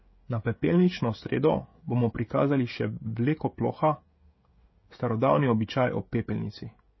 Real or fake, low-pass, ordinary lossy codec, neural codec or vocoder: fake; 7.2 kHz; MP3, 24 kbps; codec, 16 kHz, 16 kbps, FreqCodec, smaller model